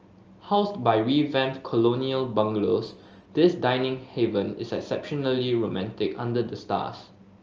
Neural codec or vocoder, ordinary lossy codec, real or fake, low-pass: none; Opus, 24 kbps; real; 7.2 kHz